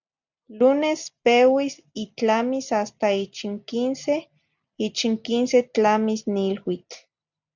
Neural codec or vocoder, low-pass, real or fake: none; 7.2 kHz; real